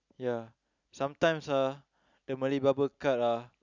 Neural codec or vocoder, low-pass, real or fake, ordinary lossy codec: none; 7.2 kHz; real; none